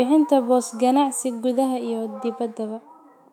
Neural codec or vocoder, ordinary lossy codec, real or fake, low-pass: autoencoder, 48 kHz, 128 numbers a frame, DAC-VAE, trained on Japanese speech; none; fake; 19.8 kHz